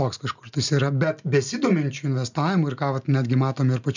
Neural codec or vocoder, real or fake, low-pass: none; real; 7.2 kHz